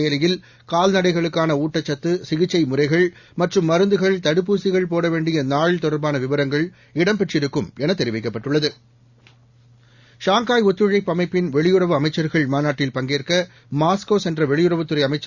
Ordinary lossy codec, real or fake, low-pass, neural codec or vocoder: Opus, 64 kbps; real; 7.2 kHz; none